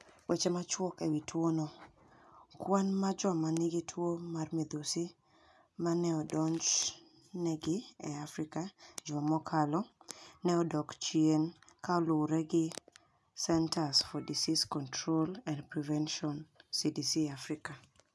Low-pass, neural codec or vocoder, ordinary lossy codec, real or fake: none; none; none; real